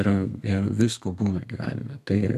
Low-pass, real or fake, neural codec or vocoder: 14.4 kHz; fake; codec, 32 kHz, 1.9 kbps, SNAC